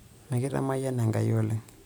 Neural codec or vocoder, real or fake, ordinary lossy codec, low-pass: none; real; none; none